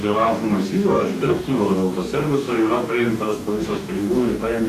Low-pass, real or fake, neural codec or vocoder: 14.4 kHz; fake; codec, 44.1 kHz, 2.6 kbps, DAC